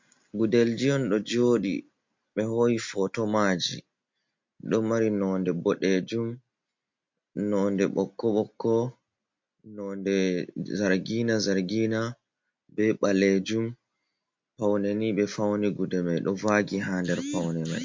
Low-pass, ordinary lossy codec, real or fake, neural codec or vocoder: 7.2 kHz; MP3, 48 kbps; real; none